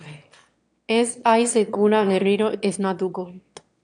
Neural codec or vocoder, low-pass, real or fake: autoencoder, 22.05 kHz, a latent of 192 numbers a frame, VITS, trained on one speaker; 9.9 kHz; fake